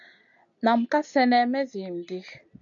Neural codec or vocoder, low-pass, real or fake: none; 7.2 kHz; real